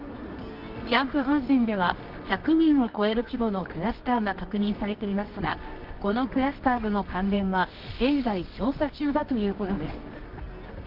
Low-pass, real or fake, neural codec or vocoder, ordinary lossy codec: 5.4 kHz; fake; codec, 24 kHz, 0.9 kbps, WavTokenizer, medium music audio release; Opus, 32 kbps